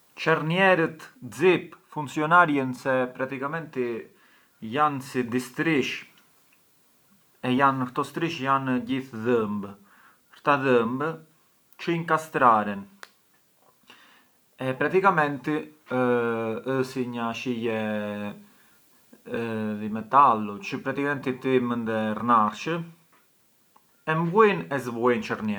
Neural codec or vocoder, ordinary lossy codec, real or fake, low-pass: none; none; real; none